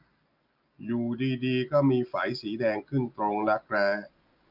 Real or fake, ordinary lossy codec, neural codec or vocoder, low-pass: real; none; none; 5.4 kHz